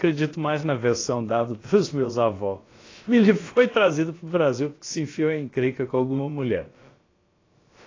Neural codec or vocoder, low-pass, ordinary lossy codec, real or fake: codec, 16 kHz, about 1 kbps, DyCAST, with the encoder's durations; 7.2 kHz; AAC, 32 kbps; fake